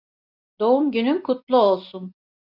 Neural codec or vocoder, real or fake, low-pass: none; real; 5.4 kHz